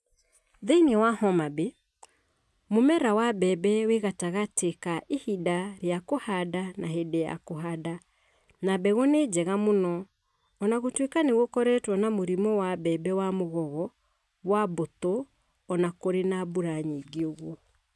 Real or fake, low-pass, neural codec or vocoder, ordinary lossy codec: real; none; none; none